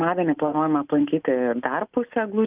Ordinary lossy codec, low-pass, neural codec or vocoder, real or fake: Opus, 24 kbps; 3.6 kHz; none; real